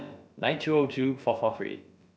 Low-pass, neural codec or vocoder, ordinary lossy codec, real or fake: none; codec, 16 kHz, about 1 kbps, DyCAST, with the encoder's durations; none; fake